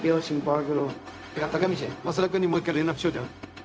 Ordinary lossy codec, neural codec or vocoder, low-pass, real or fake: none; codec, 16 kHz, 0.4 kbps, LongCat-Audio-Codec; none; fake